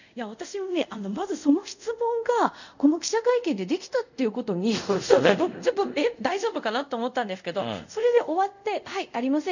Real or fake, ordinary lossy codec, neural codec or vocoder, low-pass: fake; none; codec, 24 kHz, 0.5 kbps, DualCodec; 7.2 kHz